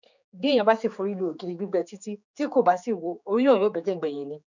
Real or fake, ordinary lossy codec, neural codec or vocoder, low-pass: fake; none; codec, 16 kHz, 4 kbps, X-Codec, HuBERT features, trained on general audio; 7.2 kHz